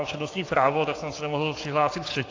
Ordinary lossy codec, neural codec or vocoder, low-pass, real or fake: AAC, 32 kbps; codec, 16 kHz, 6 kbps, DAC; 7.2 kHz; fake